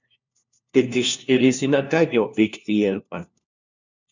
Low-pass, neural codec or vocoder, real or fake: 7.2 kHz; codec, 16 kHz, 1 kbps, FunCodec, trained on LibriTTS, 50 frames a second; fake